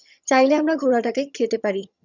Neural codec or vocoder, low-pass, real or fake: vocoder, 22.05 kHz, 80 mel bands, HiFi-GAN; 7.2 kHz; fake